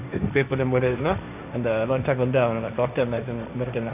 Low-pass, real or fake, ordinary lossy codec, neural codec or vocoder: 3.6 kHz; fake; none; codec, 16 kHz, 1.1 kbps, Voila-Tokenizer